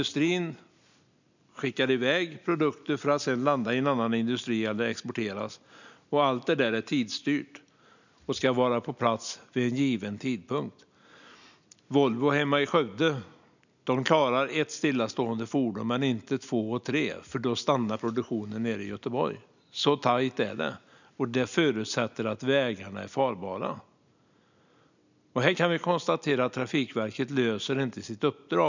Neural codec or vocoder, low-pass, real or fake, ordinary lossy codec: none; 7.2 kHz; real; none